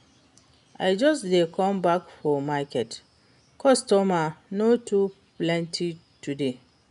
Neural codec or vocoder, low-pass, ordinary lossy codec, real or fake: none; 10.8 kHz; none; real